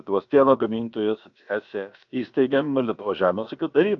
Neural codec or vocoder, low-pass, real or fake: codec, 16 kHz, about 1 kbps, DyCAST, with the encoder's durations; 7.2 kHz; fake